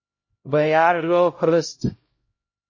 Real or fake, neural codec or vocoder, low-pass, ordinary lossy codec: fake; codec, 16 kHz, 0.5 kbps, X-Codec, HuBERT features, trained on LibriSpeech; 7.2 kHz; MP3, 32 kbps